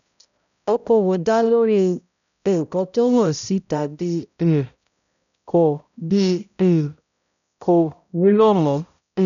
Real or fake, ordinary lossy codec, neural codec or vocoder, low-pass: fake; none; codec, 16 kHz, 0.5 kbps, X-Codec, HuBERT features, trained on balanced general audio; 7.2 kHz